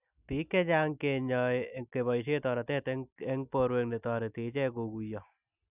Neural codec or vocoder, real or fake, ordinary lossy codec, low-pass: none; real; none; 3.6 kHz